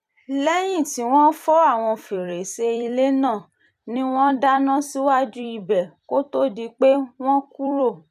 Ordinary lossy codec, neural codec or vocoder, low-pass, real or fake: none; vocoder, 44.1 kHz, 128 mel bands every 256 samples, BigVGAN v2; 14.4 kHz; fake